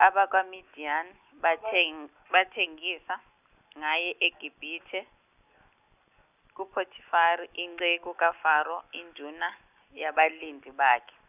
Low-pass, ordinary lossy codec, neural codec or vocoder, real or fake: 3.6 kHz; none; none; real